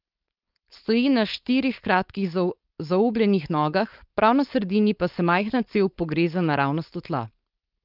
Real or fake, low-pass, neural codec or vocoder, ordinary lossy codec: fake; 5.4 kHz; codec, 16 kHz, 4.8 kbps, FACodec; Opus, 32 kbps